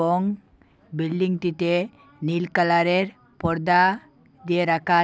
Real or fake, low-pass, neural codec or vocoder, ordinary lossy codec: real; none; none; none